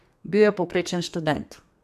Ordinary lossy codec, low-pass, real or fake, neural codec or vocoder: none; 14.4 kHz; fake; codec, 32 kHz, 1.9 kbps, SNAC